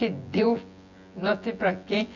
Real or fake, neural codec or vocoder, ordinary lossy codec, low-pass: fake; vocoder, 24 kHz, 100 mel bands, Vocos; none; 7.2 kHz